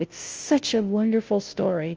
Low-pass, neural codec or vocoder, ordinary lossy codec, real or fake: 7.2 kHz; codec, 16 kHz, 0.5 kbps, FunCodec, trained on Chinese and English, 25 frames a second; Opus, 24 kbps; fake